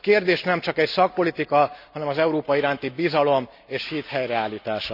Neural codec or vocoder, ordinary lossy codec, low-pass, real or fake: none; none; 5.4 kHz; real